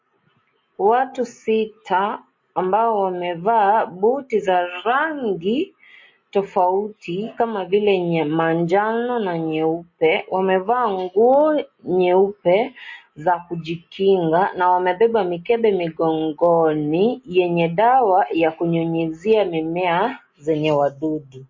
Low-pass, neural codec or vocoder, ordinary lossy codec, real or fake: 7.2 kHz; none; MP3, 32 kbps; real